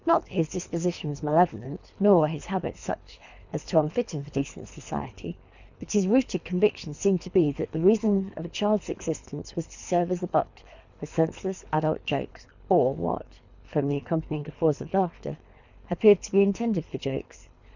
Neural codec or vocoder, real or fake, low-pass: codec, 24 kHz, 3 kbps, HILCodec; fake; 7.2 kHz